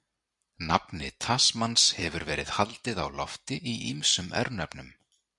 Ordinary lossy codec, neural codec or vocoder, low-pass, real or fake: MP3, 96 kbps; vocoder, 44.1 kHz, 128 mel bands every 256 samples, BigVGAN v2; 10.8 kHz; fake